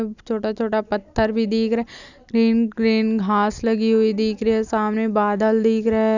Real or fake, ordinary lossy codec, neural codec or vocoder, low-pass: real; none; none; 7.2 kHz